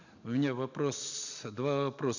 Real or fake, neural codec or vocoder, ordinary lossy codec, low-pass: real; none; none; 7.2 kHz